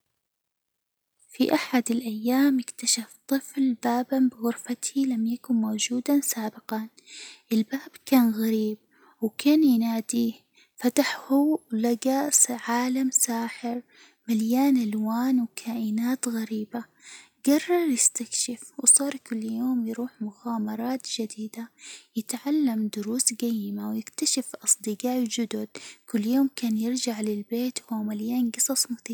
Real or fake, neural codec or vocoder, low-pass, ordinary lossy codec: real; none; none; none